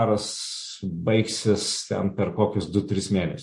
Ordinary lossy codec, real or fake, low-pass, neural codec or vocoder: MP3, 48 kbps; real; 10.8 kHz; none